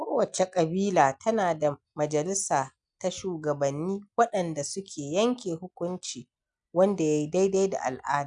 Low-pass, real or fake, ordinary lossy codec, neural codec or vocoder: 9.9 kHz; real; none; none